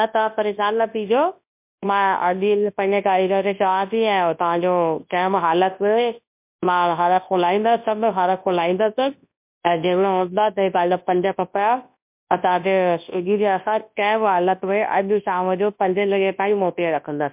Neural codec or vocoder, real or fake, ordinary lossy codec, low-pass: codec, 24 kHz, 0.9 kbps, WavTokenizer, large speech release; fake; MP3, 32 kbps; 3.6 kHz